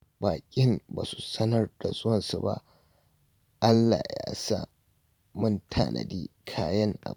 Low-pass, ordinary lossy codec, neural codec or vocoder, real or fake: 19.8 kHz; none; vocoder, 44.1 kHz, 128 mel bands every 512 samples, BigVGAN v2; fake